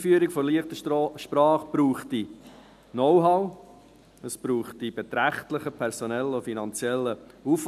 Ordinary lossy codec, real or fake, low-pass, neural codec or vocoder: none; real; 14.4 kHz; none